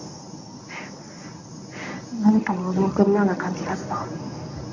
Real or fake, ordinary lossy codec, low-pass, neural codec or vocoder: fake; none; 7.2 kHz; codec, 24 kHz, 0.9 kbps, WavTokenizer, medium speech release version 1